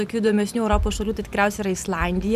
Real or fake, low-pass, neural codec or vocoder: fake; 14.4 kHz; vocoder, 44.1 kHz, 128 mel bands every 512 samples, BigVGAN v2